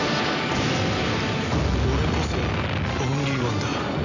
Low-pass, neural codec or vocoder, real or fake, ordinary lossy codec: 7.2 kHz; none; real; none